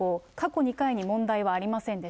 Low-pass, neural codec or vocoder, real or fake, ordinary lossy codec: none; none; real; none